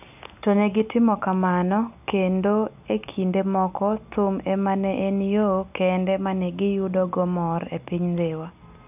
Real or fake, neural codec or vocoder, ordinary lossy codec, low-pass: real; none; none; 3.6 kHz